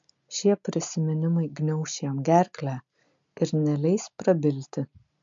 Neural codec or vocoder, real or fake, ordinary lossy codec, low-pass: none; real; MP3, 64 kbps; 7.2 kHz